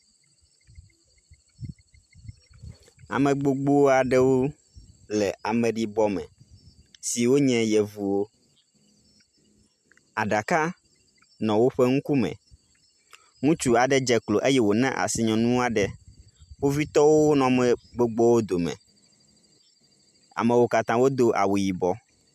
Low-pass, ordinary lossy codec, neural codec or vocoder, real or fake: 14.4 kHz; MP3, 96 kbps; none; real